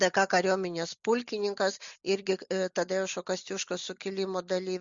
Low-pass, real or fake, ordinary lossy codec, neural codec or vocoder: 7.2 kHz; real; Opus, 64 kbps; none